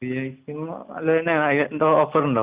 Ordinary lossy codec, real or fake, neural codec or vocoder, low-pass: Opus, 64 kbps; real; none; 3.6 kHz